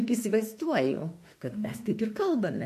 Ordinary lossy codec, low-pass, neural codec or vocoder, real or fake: MP3, 64 kbps; 14.4 kHz; autoencoder, 48 kHz, 32 numbers a frame, DAC-VAE, trained on Japanese speech; fake